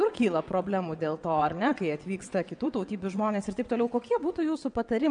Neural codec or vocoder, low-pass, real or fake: vocoder, 22.05 kHz, 80 mel bands, WaveNeXt; 9.9 kHz; fake